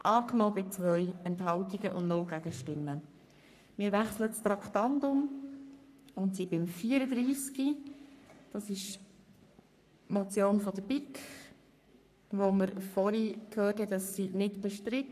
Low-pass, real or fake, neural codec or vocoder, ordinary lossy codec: 14.4 kHz; fake; codec, 44.1 kHz, 3.4 kbps, Pupu-Codec; none